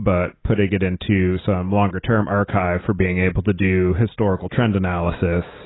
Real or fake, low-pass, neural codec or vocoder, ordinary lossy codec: real; 7.2 kHz; none; AAC, 16 kbps